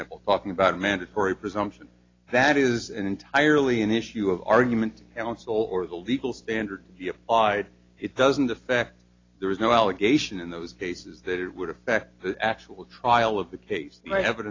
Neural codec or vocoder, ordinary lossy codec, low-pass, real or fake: none; AAC, 32 kbps; 7.2 kHz; real